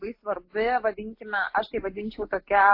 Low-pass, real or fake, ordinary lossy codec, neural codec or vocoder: 5.4 kHz; real; AAC, 32 kbps; none